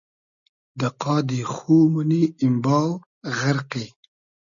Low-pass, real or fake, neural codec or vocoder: 7.2 kHz; real; none